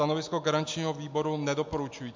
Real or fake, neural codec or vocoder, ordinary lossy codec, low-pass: real; none; MP3, 64 kbps; 7.2 kHz